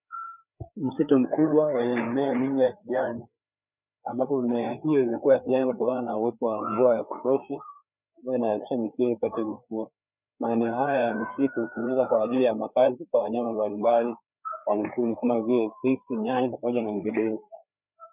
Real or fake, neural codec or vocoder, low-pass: fake; codec, 16 kHz, 4 kbps, FreqCodec, larger model; 3.6 kHz